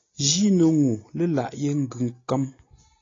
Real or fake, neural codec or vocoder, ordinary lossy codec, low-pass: real; none; AAC, 32 kbps; 7.2 kHz